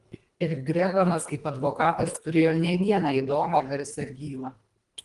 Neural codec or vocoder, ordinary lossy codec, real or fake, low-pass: codec, 24 kHz, 1.5 kbps, HILCodec; Opus, 24 kbps; fake; 10.8 kHz